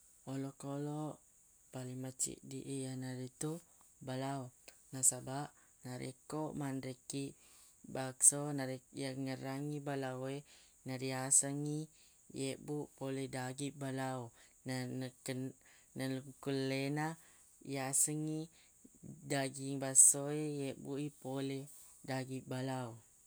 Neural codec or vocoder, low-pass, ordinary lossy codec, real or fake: none; none; none; real